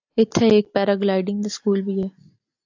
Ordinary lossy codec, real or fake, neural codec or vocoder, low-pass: AAC, 48 kbps; real; none; 7.2 kHz